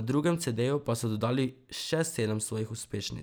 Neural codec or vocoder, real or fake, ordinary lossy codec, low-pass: none; real; none; none